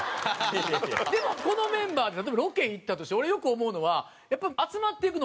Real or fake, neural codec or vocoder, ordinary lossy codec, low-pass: real; none; none; none